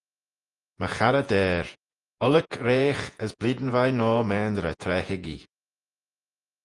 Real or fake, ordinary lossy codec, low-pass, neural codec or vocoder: fake; Opus, 32 kbps; 10.8 kHz; vocoder, 48 kHz, 128 mel bands, Vocos